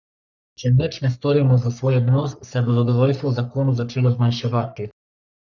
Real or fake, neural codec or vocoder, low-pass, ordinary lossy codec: fake; codec, 44.1 kHz, 3.4 kbps, Pupu-Codec; 7.2 kHz; none